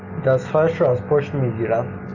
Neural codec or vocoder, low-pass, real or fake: none; 7.2 kHz; real